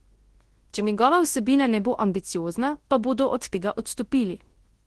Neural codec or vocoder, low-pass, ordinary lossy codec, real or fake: codec, 24 kHz, 0.9 kbps, WavTokenizer, large speech release; 10.8 kHz; Opus, 16 kbps; fake